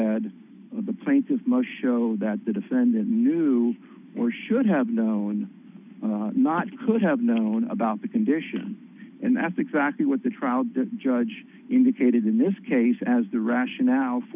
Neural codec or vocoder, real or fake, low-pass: none; real; 3.6 kHz